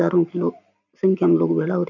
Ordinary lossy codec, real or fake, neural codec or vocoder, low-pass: none; real; none; 7.2 kHz